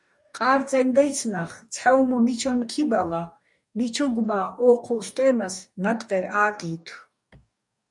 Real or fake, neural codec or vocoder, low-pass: fake; codec, 44.1 kHz, 2.6 kbps, DAC; 10.8 kHz